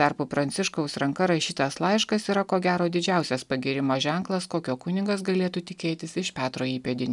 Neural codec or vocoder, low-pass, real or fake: none; 10.8 kHz; real